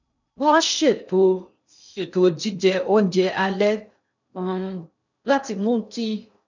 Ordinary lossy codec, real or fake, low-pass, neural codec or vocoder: none; fake; 7.2 kHz; codec, 16 kHz in and 24 kHz out, 0.6 kbps, FocalCodec, streaming, 2048 codes